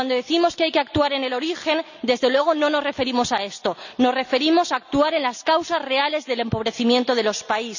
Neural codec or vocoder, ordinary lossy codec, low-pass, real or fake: none; none; 7.2 kHz; real